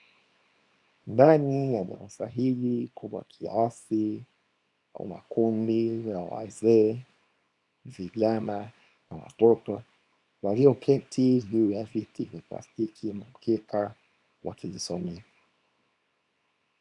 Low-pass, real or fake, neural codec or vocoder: 10.8 kHz; fake; codec, 24 kHz, 0.9 kbps, WavTokenizer, small release